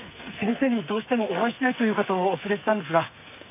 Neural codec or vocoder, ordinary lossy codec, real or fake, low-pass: codec, 44.1 kHz, 2.6 kbps, SNAC; none; fake; 3.6 kHz